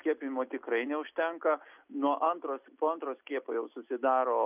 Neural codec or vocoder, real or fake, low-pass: none; real; 3.6 kHz